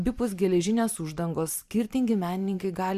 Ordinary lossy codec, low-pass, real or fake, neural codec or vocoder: Opus, 64 kbps; 14.4 kHz; fake; vocoder, 44.1 kHz, 128 mel bands every 512 samples, BigVGAN v2